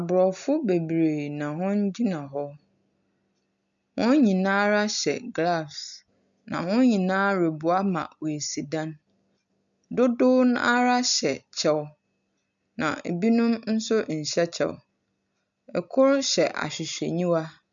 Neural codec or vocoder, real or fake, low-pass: none; real; 7.2 kHz